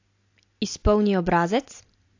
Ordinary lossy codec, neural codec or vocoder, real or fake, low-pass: AAC, 48 kbps; none; real; 7.2 kHz